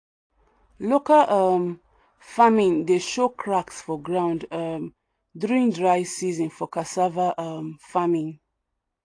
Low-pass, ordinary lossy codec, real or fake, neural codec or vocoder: 9.9 kHz; AAC, 48 kbps; real; none